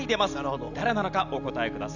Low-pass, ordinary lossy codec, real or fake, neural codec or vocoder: 7.2 kHz; none; real; none